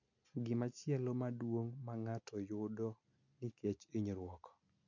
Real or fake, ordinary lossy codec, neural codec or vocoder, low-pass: real; none; none; 7.2 kHz